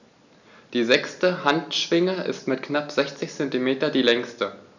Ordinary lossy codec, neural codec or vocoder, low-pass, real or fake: none; none; 7.2 kHz; real